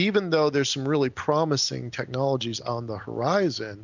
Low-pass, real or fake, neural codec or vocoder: 7.2 kHz; real; none